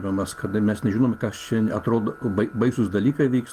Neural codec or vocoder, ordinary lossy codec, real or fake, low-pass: none; Opus, 24 kbps; real; 14.4 kHz